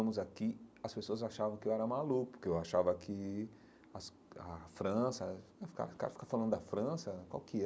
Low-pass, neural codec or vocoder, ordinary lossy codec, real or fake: none; none; none; real